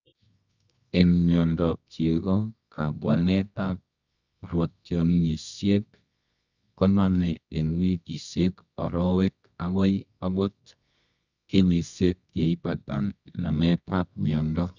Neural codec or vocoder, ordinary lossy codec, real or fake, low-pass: codec, 24 kHz, 0.9 kbps, WavTokenizer, medium music audio release; none; fake; 7.2 kHz